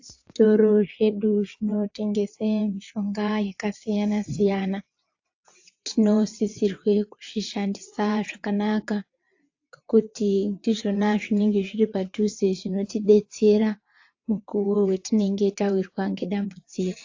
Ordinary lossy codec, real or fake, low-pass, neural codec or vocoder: AAC, 48 kbps; fake; 7.2 kHz; vocoder, 22.05 kHz, 80 mel bands, WaveNeXt